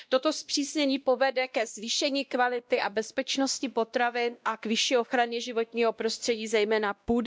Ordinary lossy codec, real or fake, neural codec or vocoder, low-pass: none; fake; codec, 16 kHz, 1 kbps, X-Codec, WavLM features, trained on Multilingual LibriSpeech; none